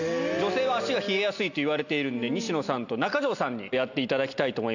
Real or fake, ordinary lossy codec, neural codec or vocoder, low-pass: real; none; none; 7.2 kHz